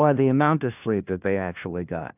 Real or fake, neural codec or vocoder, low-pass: fake; codec, 16 kHz, 1 kbps, FunCodec, trained on LibriTTS, 50 frames a second; 3.6 kHz